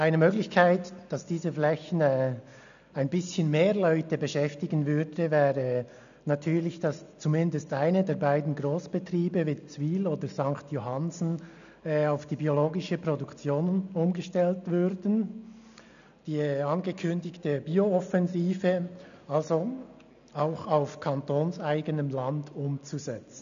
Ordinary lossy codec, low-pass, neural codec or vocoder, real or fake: MP3, 96 kbps; 7.2 kHz; none; real